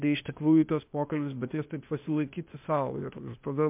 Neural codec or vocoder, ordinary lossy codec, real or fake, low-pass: codec, 16 kHz, 0.8 kbps, ZipCodec; MP3, 32 kbps; fake; 3.6 kHz